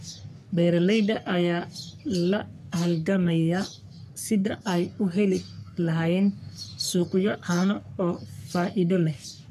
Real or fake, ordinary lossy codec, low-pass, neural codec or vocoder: fake; none; 14.4 kHz; codec, 44.1 kHz, 3.4 kbps, Pupu-Codec